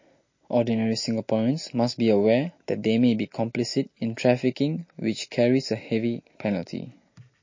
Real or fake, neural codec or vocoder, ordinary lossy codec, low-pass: real; none; MP3, 32 kbps; 7.2 kHz